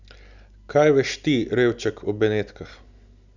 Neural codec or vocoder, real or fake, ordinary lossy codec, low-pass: none; real; none; 7.2 kHz